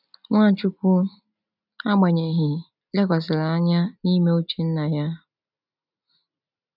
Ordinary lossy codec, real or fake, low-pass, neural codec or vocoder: none; real; 5.4 kHz; none